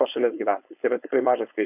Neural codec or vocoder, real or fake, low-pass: codec, 16 kHz, 4.8 kbps, FACodec; fake; 3.6 kHz